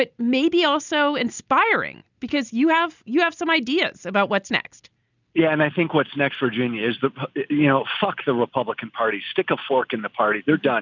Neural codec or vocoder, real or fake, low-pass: none; real; 7.2 kHz